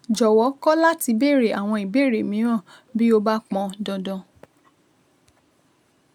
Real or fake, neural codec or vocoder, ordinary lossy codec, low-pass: real; none; none; 19.8 kHz